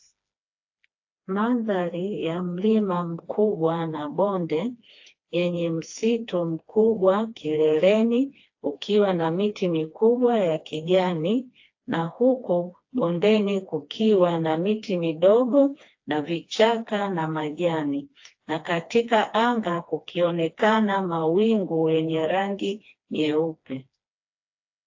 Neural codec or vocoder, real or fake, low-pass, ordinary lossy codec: codec, 16 kHz, 2 kbps, FreqCodec, smaller model; fake; 7.2 kHz; AAC, 48 kbps